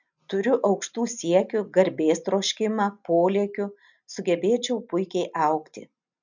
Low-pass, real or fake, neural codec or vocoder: 7.2 kHz; real; none